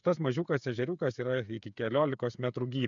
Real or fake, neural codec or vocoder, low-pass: fake; codec, 16 kHz, 8 kbps, FreqCodec, smaller model; 7.2 kHz